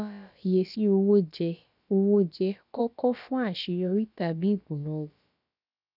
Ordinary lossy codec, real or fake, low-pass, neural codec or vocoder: none; fake; 5.4 kHz; codec, 16 kHz, about 1 kbps, DyCAST, with the encoder's durations